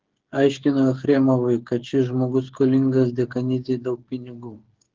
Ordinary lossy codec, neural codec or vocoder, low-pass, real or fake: Opus, 16 kbps; codec, 16 kHz, 8 kbps, FreqCodec, smaller model; 7.2 kHz; fake